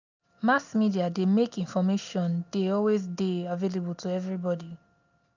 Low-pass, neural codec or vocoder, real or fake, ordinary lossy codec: 7.2 kHz; none; real; none